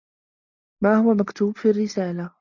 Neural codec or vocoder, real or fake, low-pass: none; real; 7.2 kHz